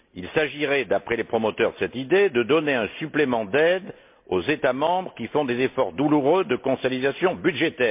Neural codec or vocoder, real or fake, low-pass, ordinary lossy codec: none; real; 3.6 kHz; MP3, 32 kbps